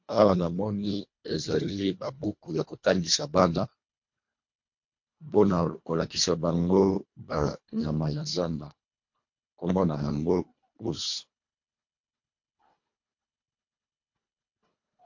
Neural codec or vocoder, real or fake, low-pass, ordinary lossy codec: codec, 24 kHz, 1.5 kbps, HILCodec; fake; 7.2 kHz; MP3, 48 kbps